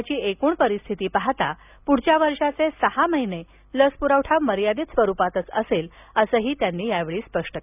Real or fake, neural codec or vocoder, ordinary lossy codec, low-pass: real; none; none; 3.6 kHz